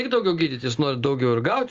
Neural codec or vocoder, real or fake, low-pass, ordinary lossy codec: none; real; 7.2 kHz; Opus, 32 kbps